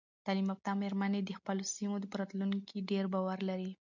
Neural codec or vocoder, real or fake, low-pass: none; real; 7.2 kHz